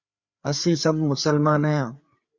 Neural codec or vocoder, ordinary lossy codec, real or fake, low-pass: codec, 16 kHz, 4 kbps, FreqCodec, larger model; Opus, 64 kbps; fake; 7.2 kHz